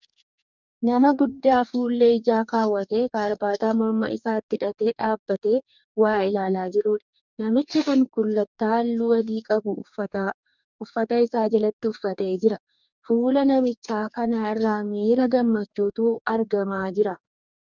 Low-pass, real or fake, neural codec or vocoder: 7.2 kHz; fake; codec, 44.1 kHz, 2.6 kbps, DAC